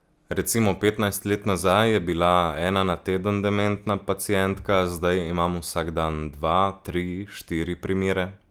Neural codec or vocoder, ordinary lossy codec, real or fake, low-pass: none; Opus, 32 kbps; real; 19.8 kHz